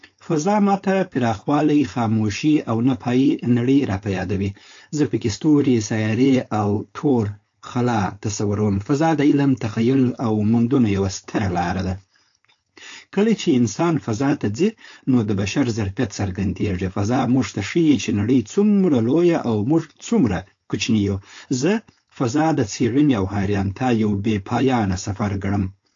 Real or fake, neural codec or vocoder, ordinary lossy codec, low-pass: fake; codec, 16 kHz, 4.8 kbps, FACodec; AAC, 48 kbps; 7.2 kHz